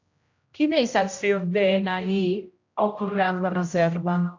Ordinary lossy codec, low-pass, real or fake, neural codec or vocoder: AAC, 48 kbps; 7.2 kHz; fake; codec, 16 kHz, 0.5 kbps, X-Codec, HuBERT features, trained on general audio